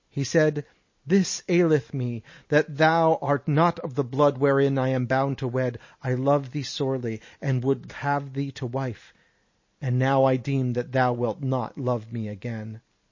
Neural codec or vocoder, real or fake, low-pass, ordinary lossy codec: none; real; 7.2 kHz; MP3, 32 kbps